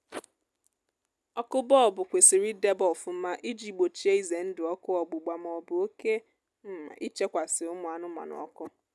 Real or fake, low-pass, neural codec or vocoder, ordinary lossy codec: real; none; none; none